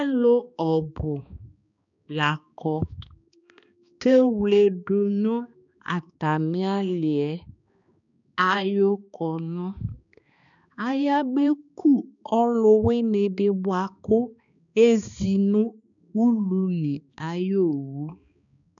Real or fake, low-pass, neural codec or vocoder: fake; 7.2 kHz; codec, 16 kHz, 2 kbps, X-Codec, HuBERT features, trained on balanced general audio